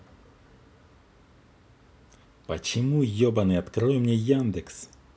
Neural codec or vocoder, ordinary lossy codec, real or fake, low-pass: none; none; real; none